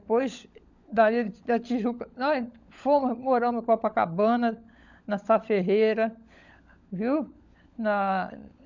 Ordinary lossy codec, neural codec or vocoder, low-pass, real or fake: none; codec, 16 kHz, 16 kbps, FunCodec, trained on LibriTTS, 50 frames a second; 7.2 kHz; fake